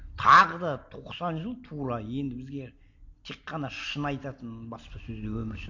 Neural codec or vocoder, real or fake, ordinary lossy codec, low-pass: none; real; AAC, 48 kbps; 7.2 kHz